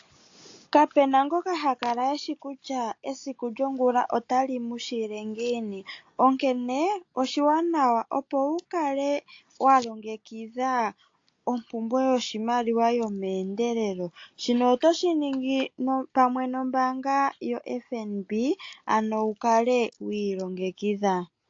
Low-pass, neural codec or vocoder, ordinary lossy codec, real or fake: 7.2 kHz; none; AAC, 48 kbps; real